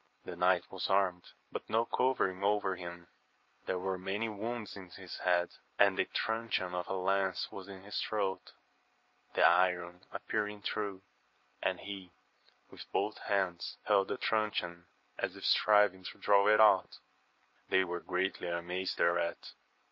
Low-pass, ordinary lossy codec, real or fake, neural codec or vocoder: 7.2 kHz; MP3, 32 kbps; real; none